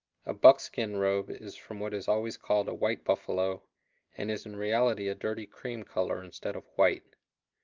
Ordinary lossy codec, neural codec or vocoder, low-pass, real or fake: Opus, 24 kbps; none; 7.2 kHz; real